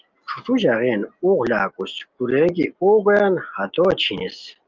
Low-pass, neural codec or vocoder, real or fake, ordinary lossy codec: 7.2 kHz; none; real; Opus, 32 kbps